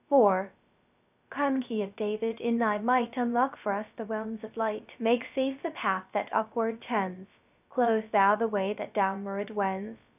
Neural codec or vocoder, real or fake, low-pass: codec, 16 kHz, 0.3 kbps, FocalCodec; fake; 3.6 kHz